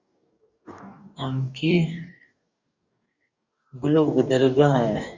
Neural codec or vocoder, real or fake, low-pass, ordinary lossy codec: codec, 44.1 kHz, 2.6 kbps, DAC; fake; 7.2 kHz; Opus, 64 kbps